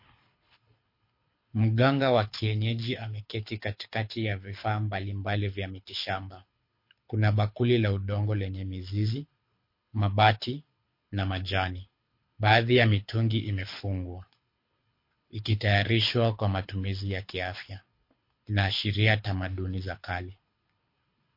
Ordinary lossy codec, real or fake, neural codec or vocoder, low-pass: MP3, 32 kbps; fake; codec, 24 kHz, 6 kbps, HILCodec; 5.4 kHz